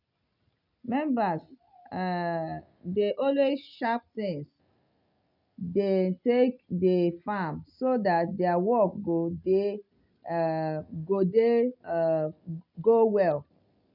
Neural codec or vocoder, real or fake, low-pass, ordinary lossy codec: none; real; 5.4 kHz; none